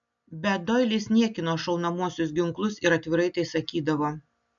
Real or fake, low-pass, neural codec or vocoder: real; 7.2 kHz; none